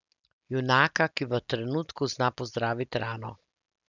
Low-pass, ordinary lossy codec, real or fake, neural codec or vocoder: 7.2 kHz; none; real; none